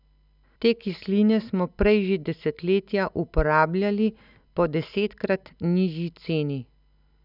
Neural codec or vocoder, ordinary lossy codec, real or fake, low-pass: none; none; real; 5.4 kHz